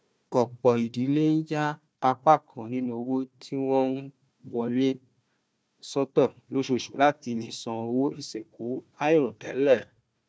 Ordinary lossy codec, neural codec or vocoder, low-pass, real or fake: none; codec, 16 kHz, 1 kbps, FunCodec, trained on Chinese and English, 50 frames a second; none; fake